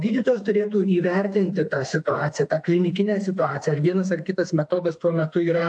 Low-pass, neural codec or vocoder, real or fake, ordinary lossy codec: 9.9 kHz; autoencoder, 48 kHz, 32 numbers a frame, DAC-VAE, trained on Japanese speech; fake; AAC, 64 kbps